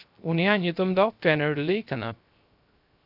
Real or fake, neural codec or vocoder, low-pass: fake; codec, 16 kHz, 0.3 kbps, FocalCodec; 5.4 kHz